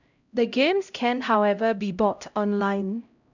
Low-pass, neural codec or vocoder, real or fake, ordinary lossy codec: 7.2 kHz; codec, 16 kHz, 0.5 kbps, X-Codec, HuBERT features, trained on LibriSpeech; fake; none